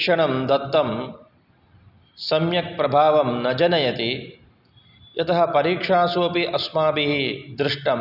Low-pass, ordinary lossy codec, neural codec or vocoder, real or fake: 5.4 kHz; none; none; real